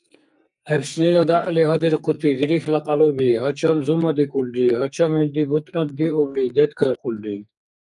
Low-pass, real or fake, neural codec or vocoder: 10.8 kHz; fake; codec, 44.1 kHz, 2.6 kbps, SNAC